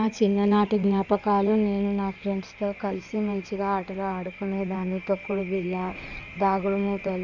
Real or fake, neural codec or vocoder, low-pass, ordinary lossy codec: fake; codec, 16 kHz in and 24 kHz out, 2.2 kbps, FireRedTTS-2 codec; 7.2 kHz; none